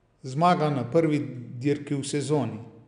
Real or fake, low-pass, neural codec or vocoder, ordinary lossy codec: real; 9.9 kHz; none; none